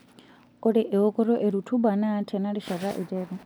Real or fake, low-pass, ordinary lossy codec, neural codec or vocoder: real; none; none; none